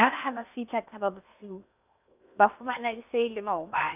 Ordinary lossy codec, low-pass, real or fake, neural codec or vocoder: none; 3.6 kHz; fake; codec, 16 kHz in and 24 kHz out, 0.8 kbps, FocalCodec, streaming, 65536 codes